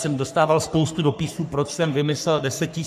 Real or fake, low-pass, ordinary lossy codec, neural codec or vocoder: fake; 14.4 kHz; AAC, 96 kbps; codec, 44.1 kHz, 3.4 kbps, Pupu-Codec